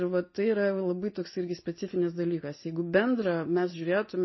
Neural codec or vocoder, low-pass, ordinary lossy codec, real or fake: none; 7.2 kHz; MP3, 24 kbps; real